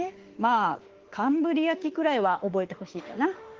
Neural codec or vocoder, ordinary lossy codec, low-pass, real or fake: autoencoder, 48 kHz, 32 numbers a frame, DAC-VAE, trained on Japanese speech; Opus, 16 kbps; 7.2 kHz; fake